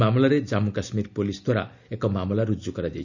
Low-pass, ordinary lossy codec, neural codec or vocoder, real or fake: 7.2 kHz; none; none; real